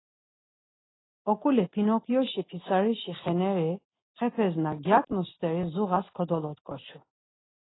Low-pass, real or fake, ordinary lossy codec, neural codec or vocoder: 7.2 kHz; real; AAC, 16 kbps; none